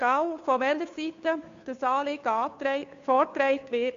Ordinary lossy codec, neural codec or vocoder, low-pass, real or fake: MP3, 48 kbps; codec, 16 kHz, 2 kbps, FunCodec, trained on Chinese and English, 25 frames a second; 7.2 kHz; fake